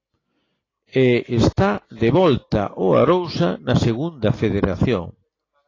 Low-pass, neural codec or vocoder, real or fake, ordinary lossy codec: 7.2 kHz; none; real; AAC, 32 kbps